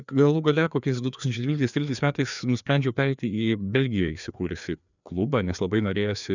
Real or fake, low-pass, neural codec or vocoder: fake; 7.2 kHz; codec, 16 kHz, 2 kbps, FreqCodec, larger model